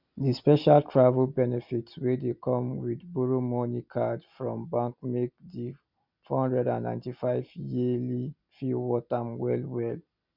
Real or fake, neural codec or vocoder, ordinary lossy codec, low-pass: real; none; none; 5.4 kHz